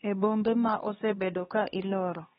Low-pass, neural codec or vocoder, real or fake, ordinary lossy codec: 7.2 kHz; codec, 16 kHz, 2 kbps, X-Codec, HuBERT features, trained on LibriSpeech; fake; AAC, 16 kbps